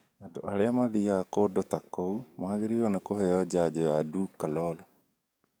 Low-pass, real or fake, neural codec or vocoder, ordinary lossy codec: none; fake; codec, 44.1 kHz, 7.8 kbps, DAC; none